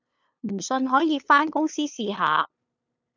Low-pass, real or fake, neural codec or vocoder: 7.2 kHz; fake; codec, 16 kHz, 2 kbps, FunCodec, trained on LibriTTS, 25 frames a second